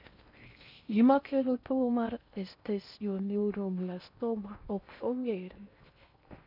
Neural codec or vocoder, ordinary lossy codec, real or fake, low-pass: codec, 16 kHz in and 24 kHz out, 0.6 kbps, FocalCodec, streaming, 4096 codes; none; fake; 5.4 kHz